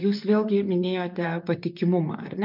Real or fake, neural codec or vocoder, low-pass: fake; vocoder, 44.1 kHz, 128 mel bands, Pupu-Vocoder; 5.4 kHz